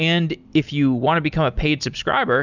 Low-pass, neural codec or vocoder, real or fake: 7.2 kHz; none; real